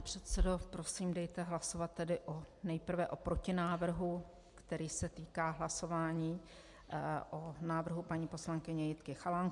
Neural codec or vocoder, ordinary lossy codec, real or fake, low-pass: none; MP3, 64 kbps; real; 10.8 kHz